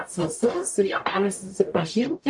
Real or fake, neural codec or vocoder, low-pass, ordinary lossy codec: fake; codec, 44.1 kHz, 0.9 kbps, DAC; 10.8 kHz; AAC, 64 kbps